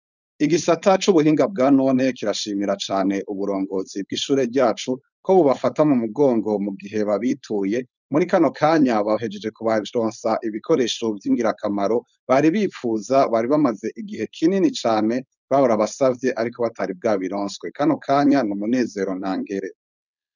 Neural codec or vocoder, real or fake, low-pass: codec, 16 kHz, 4.8 kbps, FACodec; fake; 7.2 kHz